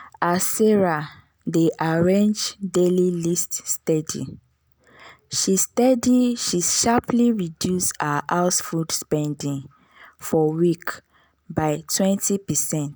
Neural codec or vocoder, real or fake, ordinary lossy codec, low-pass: none; real; none; none